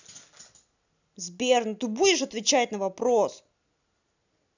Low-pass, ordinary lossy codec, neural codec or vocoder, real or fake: 7.2 kHz; none; none; real